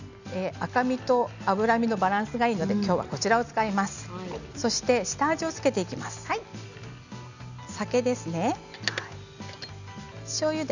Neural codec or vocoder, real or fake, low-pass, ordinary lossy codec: none; real; 7.2 kHz; none